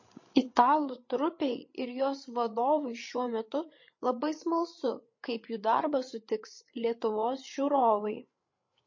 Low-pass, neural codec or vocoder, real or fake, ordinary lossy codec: 7.2 kHz; codec, 16 kHz, 8 kbps, FreqCodec, larger model; fake; MP3, 32 kbps